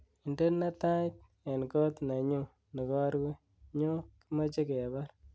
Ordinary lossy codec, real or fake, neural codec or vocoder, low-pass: none; real; none; none